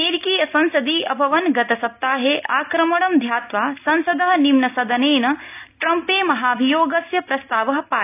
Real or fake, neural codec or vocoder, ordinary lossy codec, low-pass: real; none; AAC, 32 kbps; 3.6 kHz